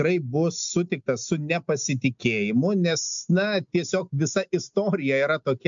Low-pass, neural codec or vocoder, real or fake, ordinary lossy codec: 7.2 kHz; none; real; MP3, 64 kbps